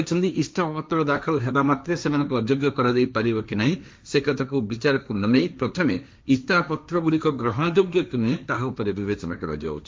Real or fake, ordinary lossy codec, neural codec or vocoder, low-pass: fake; none; codec, 16 kHz, 1.1 kbps, Voila-Tokenizer; none